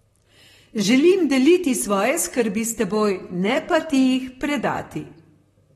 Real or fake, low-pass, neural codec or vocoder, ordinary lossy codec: fake; 19.8 kHz; vocoder, 44.1 kHz, 128 mel bands, Pupu-Vocoder; AAC, 32 kbps